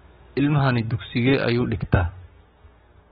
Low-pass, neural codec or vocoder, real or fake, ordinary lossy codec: 7.2 kHz; codec, 16 kHz, 6 kbps, DAC; fake; AAC, 16 kbps